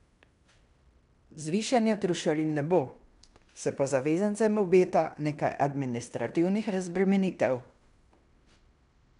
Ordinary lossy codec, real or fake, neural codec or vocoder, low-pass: none; fake; codec, 16 kHz in and 24 kHz out, 0.9 kbps, LongCat-Audio-Codec, fine tuned four codebook decoder; 10.8 kHz